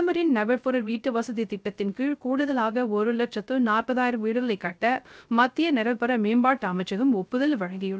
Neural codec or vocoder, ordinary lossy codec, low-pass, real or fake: codec, 16 kHz, 0.3 kbps, FocalCodec; none; none; fake